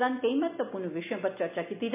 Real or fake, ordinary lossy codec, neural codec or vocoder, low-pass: real; MP3, 32 kbps; none; 3.6 kHz